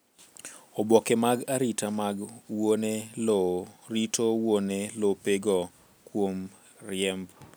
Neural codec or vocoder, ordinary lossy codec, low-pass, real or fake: none; none; none; real